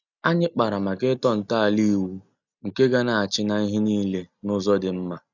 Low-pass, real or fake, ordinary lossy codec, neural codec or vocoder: 7.2 kHz; real; none; none